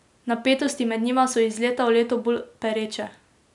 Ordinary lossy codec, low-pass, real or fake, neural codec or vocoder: none; 10.8 kHz; real; none